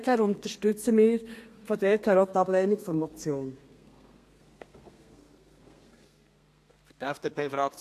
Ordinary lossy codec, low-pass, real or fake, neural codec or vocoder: AAC, 64 kbps; 14.4 kHz; fake; codec, 32 kHz, 1.9 kbps, SNAC